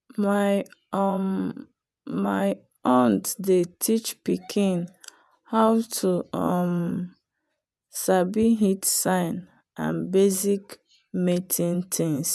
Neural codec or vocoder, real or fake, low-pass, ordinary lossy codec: vocoder, 24 kHz, 100 mel bands, Vocos; fake; none; none